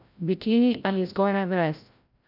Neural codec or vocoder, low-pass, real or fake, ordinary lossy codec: codec, 16 kHz, 0.5 kbps, FreqCodec, larger model; 5.4 kHz; fake; none